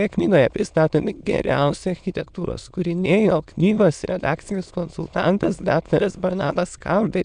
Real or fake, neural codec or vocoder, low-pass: fake; autoencoder, 22.05 kHz, a latent of 192 numbers a frame, VITS, trained on many speakers; 9.9 kHz